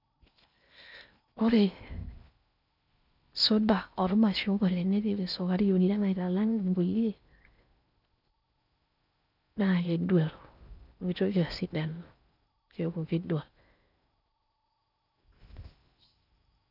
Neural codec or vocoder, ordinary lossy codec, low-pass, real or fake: codec, 16 kHz in and 24 kHz out, 0.6 kbps, FocalCodec, streaming, 4096 codes; none; 5.4 kHz; fake